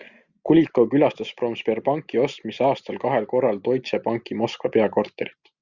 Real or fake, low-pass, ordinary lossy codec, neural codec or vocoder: real; 7.2 kHz; Opus, 64 kbps; none